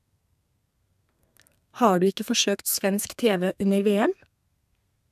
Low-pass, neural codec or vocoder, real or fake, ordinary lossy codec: 14.4 kHz; codec, 32 kHz, 1.9 kbps, SNAC; fake; none